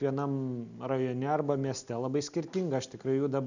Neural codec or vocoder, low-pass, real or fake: none; 7.2 kHz; real